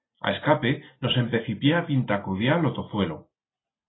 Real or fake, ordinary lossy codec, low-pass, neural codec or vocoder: real; AAC, 16 kbps; 7.2 kHz; none